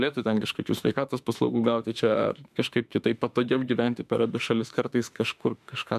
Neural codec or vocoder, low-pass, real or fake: autoencoder, 48 kHz, 32 numbers a frame, DAC-VAE, trained on Japanese speech; 14.4 kHz; fake